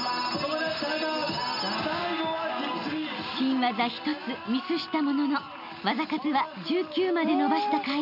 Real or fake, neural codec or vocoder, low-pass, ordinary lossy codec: real; none; 5.4 kHz; none